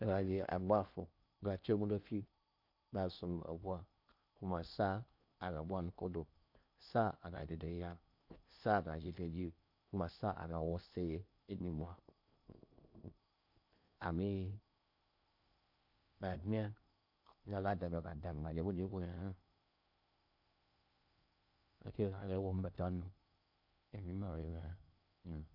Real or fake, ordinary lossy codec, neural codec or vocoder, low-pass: fake; MP3, 48 kbps; codec, 16 kHz in and 24 kHz out, 0.8 kbps, FocalCodec, streaming, 65536 codes; 5.4 kHz